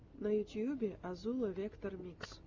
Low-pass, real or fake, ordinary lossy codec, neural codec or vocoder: 7.2 kHz; real; Opus, 32 kbps; none